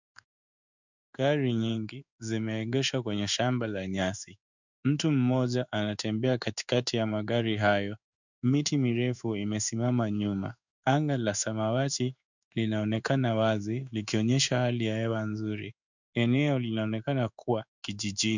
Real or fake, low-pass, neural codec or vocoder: fake; 7.2 kHz; codec, 16 kHz in and 24 kHz out, 1 kbps, XY-Tokenizer